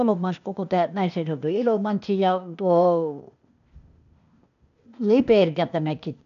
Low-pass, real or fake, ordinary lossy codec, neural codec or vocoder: 7.2 kHz; fake; none; codec, 16 kHz, 0.8 kbps, ZipCodec